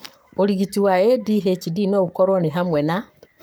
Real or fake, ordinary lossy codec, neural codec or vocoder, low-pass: fake; none; codec, 44.1 kHz, 7.8 kbps, Pupu-Codec; none